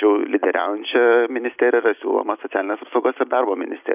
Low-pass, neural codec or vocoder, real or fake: 3.6 kHz; none; real